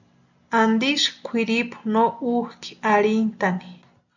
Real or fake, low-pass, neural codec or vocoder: real; 7.2 kHz; none